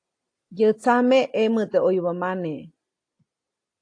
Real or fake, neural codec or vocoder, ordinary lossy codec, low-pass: real; none; AAC, 48 kbps; 9.9 kHz